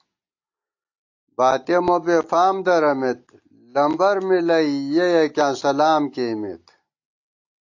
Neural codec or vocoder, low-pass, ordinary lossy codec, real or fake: none; 7.2 kHz; AAC, 48 kbps; real